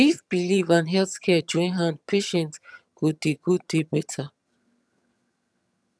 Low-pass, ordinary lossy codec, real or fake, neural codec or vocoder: none; none; fake; vocoder, 22.05 kHz, 80 mel bands, HiFi-GAN